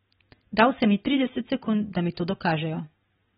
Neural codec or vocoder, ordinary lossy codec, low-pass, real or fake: none; AAC, 16 kbps; 7.2 kHz; real